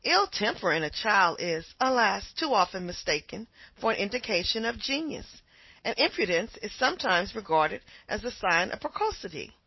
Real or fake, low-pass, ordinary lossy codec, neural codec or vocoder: real; 7.2 kHz; MP3, 24 kbps; none